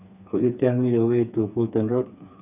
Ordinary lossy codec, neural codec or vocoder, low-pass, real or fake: none; codec, 16 kHz, 4 kbps, FreqCodec, smaller model; 3.6 kHz; fake